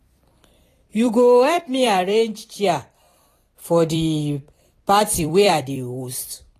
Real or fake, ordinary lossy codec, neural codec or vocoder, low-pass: fake; AAC, 48 kbps; vocoder, 44.1 kHz, 128 mel bands every 256 samples, BigVGAN v2; 14.4 kHz